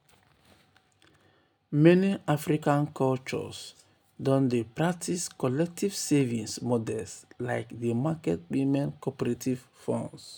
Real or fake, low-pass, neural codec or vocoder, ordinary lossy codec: real; none; none; none